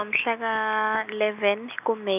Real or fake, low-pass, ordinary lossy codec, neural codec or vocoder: real; 3.6 kHz; none; none